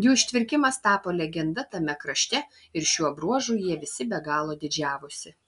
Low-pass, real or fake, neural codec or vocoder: 10.8 kHz; real; none